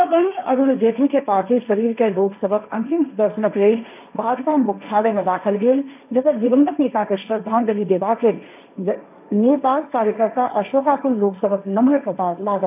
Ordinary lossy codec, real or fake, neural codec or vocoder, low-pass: none; fake; codec, 16 kHz, 1.1 kbps, Voila-Tokenizer; 3.6 kHz